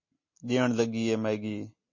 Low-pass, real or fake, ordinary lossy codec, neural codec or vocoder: 7.2 kHz; real; MP3, 32 kbps; none